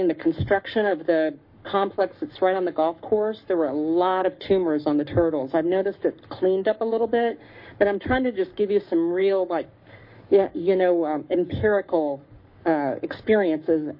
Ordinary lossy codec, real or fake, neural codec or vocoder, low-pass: MP3, 32 kbps; fake; codec, 44.1 kHz, 7.8 kbps, Pupu-Codec; 5.4 kHz